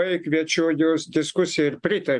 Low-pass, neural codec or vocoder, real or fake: 10.8 kHz; none; real